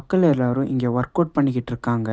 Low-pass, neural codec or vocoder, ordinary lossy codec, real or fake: none; none; none; real